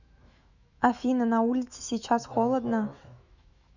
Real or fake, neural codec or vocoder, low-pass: fake; autoencoder, 48 kHz, 128 numbers a frame, DAC-VAE, trained on Japanese speech; 7.2 kHz